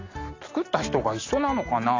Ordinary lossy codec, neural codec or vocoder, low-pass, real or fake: AAC, 32 kbps; none; 7.2 kHz; real